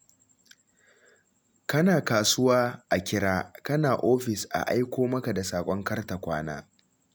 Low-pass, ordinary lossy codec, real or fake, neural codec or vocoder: none; none; real; none